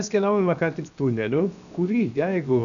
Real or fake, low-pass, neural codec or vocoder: fake; 7.2 kHz; codec, 16 kHz, 0.7 kbps, FocalCodec